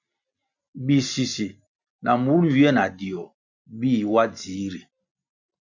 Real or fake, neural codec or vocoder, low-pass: real; none; 7.2 kHz